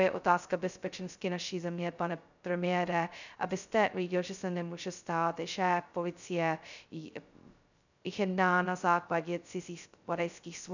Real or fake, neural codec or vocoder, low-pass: fake; codec, 16 kHz, 0.2 kbps, FocalCodec; 7.2 kHz